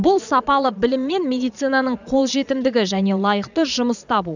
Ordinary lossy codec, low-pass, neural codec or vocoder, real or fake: none; 7.2 kHz; vocoder, 22.05 kHz, 80 mel bands, Vocos; fake